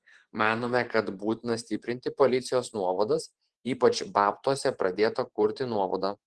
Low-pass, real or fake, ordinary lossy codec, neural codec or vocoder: 10.8 kHz; fake; Opus, 16 kbps; vocoder, 24 kHz, 100 mel bands, Vocos